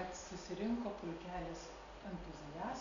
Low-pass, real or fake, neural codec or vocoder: 7.2 kHz; real; none